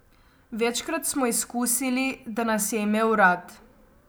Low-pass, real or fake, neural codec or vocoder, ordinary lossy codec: none; real; none; none